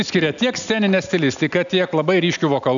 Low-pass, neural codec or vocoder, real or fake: 7.2 kHz; none; real